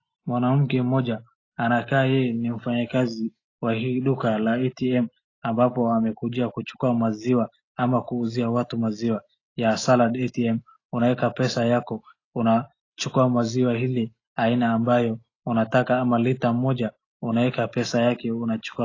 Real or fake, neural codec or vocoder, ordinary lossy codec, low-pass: real; none; AAC, 32 kbps; 7.2 kHz